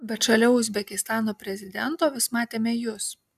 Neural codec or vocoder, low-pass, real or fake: none; 14.4 kHz; real